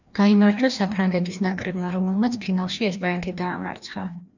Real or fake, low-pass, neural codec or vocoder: fake; 7.2 kHz; codec, 16 kHz, 1 kbps, FreqCodec, larger model